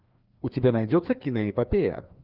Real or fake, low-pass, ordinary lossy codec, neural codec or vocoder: fake; 5.4 kHz; Opus, 32 kbps; codec, 16 kHz, 2 kbps, FreqCodec, larger model